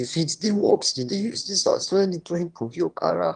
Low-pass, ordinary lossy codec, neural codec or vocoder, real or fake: 9.9 kHz; Opus, 24 kbps; autoencoder, 22.05 kHz, a latent of 192 numbers a frame, VITS, trained on one speaker; fake